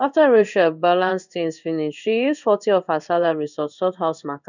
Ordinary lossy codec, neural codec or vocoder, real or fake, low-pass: none; codec, 16 kHz in and 24 kHz out, 1 kbps, XY-Tokenizer; fake; 7.2 kHz